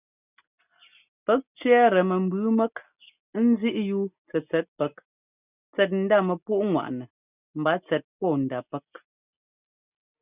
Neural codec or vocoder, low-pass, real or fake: none; 3.6 kHz; real